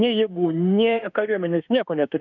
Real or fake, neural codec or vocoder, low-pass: fake; autoencoder, 48 kHz, 32 numbers a frame, DAC-VAE, trained on Japanese speech; 7.2 kHz